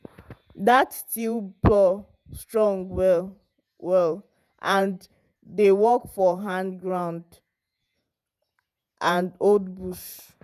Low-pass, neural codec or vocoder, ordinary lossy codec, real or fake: 14.4 kHz; vocoder, 48 kHz, 128 mel bands, Vocos; none; fake